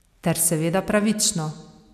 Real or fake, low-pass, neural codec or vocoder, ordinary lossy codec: real; 14.4 kHz; none; AAC, 96 kbps